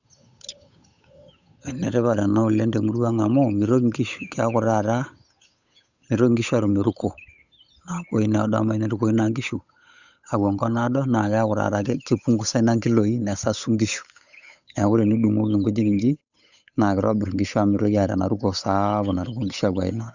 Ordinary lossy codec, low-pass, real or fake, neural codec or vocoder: none; 7.2 kHz; fake; codec, 16 kHz, 8 kbps, FunCodec, trained on Chinese and English, 25 frames a second